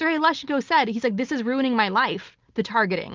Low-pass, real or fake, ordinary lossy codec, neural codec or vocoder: 7.2 kHz; real; Opus, 24 kbps; none